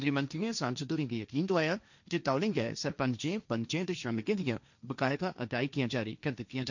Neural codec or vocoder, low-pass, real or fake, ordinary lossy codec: codec, 16 kHz, 1.1 kbps, Voila-Tokenizer; 7.2 kHz; fake; none